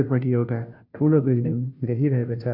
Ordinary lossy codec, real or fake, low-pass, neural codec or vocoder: none; fake; 5.4 kHz; codec, 16 kHz, 0.5 kbps, FunCodec, trained on Chinese and English, 25 frames a second